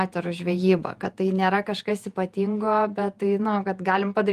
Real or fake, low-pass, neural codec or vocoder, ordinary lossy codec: fake; 14.4 kHz; vocoder, 48 kHz, 128 mel bands, Vocos; Opus, 32 kbps